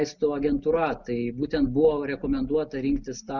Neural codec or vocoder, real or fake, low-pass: none; real; 7.2 kHz